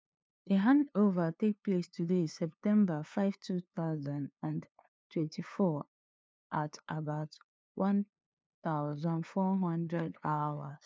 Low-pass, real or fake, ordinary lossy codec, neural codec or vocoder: none; fake; none; codec, 16 kHz, 2 kbps, FunCodec, trained on LibriTTS, 25 frames a second